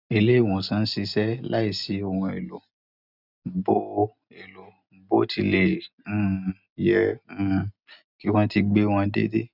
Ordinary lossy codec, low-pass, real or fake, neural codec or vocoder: none; 5.4 kHz; real; none